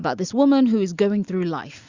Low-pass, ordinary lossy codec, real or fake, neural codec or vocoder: 7.2 kHz; Opus, 64 kbps; real; none